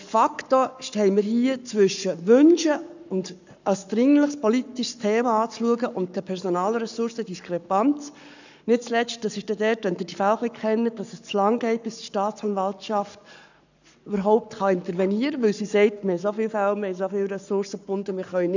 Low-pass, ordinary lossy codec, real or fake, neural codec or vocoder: 7.2 kHz; none; fake; codec, 44.1 kHz, 7.8 kbps, Pupu-Codec